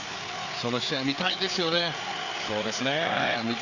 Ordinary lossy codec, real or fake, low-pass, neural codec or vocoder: none; fake; 7.2 kHz; codec, 16 kHz, 4 kbps, FreqCodec, larger model